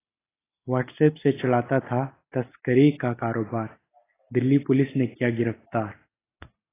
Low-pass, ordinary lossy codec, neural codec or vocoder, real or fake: 3.6 kHz; AAC, 16 kbps; none; real